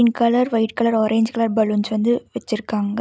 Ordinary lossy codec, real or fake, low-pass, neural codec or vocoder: none; real; none; none